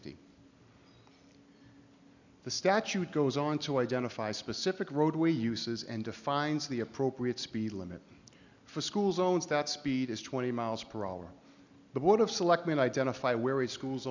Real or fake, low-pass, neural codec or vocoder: real; 7.2 kHz; none